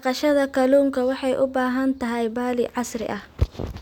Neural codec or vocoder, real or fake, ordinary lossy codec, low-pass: none; real; none; none